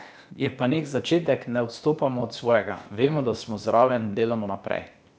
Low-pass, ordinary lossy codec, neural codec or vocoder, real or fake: none; none; codec, 16 kHz, 0.8 kbps, ZipCodec; fake